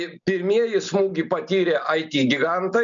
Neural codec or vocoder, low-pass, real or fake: none; 7.2 kHz; real